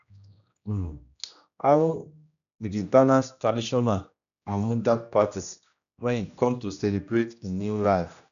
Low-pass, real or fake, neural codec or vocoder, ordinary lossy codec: 7.2 kHz; fake; codec, 16 kHz, 1 kbps, X-Codec, HuBERT features, trained on general audio; none